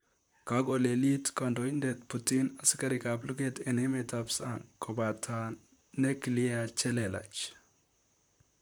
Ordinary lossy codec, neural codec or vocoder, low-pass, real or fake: none; vocoder, 44.1 kHz, 128 mel bands, Pupu-Vocoder; none; fake